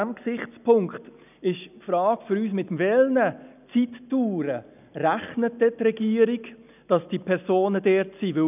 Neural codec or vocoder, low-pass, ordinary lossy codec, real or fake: none; 3.6 kHz; none; real